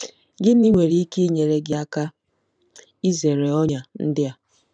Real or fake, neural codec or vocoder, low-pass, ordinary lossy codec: fake; vocoder, 44.1 kHz, 128 mel bands every 512 samples, BigVGAN v2; 9.9 kHz; none